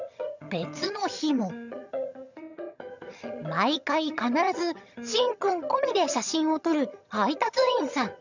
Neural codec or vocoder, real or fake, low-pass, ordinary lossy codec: vocoder, 22.05 kHz, 80 mel bands, HiFi-GAN; fake; 7.2 kHz; none